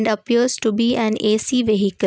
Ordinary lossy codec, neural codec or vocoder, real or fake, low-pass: none; none; real; none